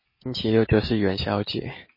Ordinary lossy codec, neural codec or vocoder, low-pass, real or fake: MP3, 24 kbps; none; 5.4 kHz; real